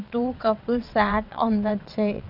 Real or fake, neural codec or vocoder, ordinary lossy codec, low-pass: fake; vocoder, 22.05 kHz, 80 mel bands, WaveNeXt; none; 5.4 kHz